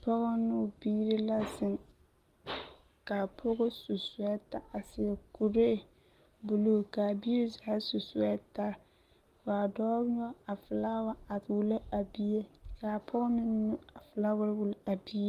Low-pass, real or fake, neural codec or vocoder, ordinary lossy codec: 14.4 kHz; real; none; Opus, 24 kbps